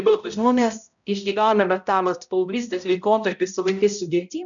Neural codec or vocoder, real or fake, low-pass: codec, 16 kHz, 0.5 kbps, X-Codec, HuBERT features, trained on balanced general audio; fake; 7.2 kHz